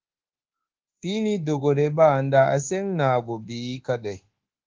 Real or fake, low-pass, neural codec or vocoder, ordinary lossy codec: fake; 7.2 kHz; codec, 24 kHz, 1.2 kbps, DualCodec; Opus, 16 kbps